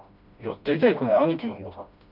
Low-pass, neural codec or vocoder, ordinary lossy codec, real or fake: 5.4 kHz; codec, 16 kHz, 1 kbps, FreqCodec, smaller model; none; fake